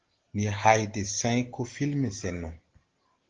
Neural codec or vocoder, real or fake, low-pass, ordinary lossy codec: none; real; 7.2 kHz; Opus, 16 kbps